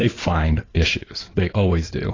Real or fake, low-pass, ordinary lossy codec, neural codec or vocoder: fake; 7.2 kHz; AAC, 32 kbps; codec, 16 kHz in and 24 kHz out, 2.2 kbps, FireRedTTS-2 codec